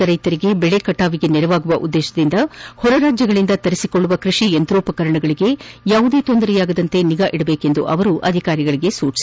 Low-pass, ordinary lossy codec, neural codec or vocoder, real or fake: none; none; none; real